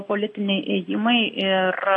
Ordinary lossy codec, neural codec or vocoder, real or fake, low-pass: AAC, 32 kbps; none; real; 10.8 kHz